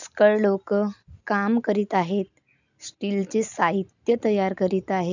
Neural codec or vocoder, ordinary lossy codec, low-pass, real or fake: none; none; 7.2 kHz; real